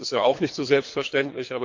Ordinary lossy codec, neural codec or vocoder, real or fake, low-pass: MP3, 48 kbps; codec, 24 kHz, 3 kbps, HILCodec; fake; 7.2 kHz